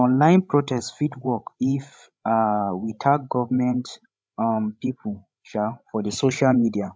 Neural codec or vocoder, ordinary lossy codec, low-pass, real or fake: codec, 16 kHz, 16 kbps, FreqCodec, larger model; none; none; fake